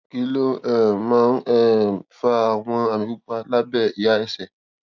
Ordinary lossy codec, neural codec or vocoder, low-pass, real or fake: none; none; 7.2 kHz; real